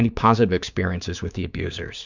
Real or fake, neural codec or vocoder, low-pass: fake; codec, 16 kHz, 6 kbps, DAC; 7.2 kHz